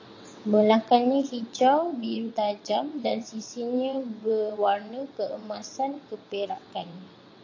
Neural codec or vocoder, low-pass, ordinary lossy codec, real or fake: vocoder, 22.05 kHz, 80 mel bands, WaveNeXt; 7.2 kHz; AAC, 48 kbps; fake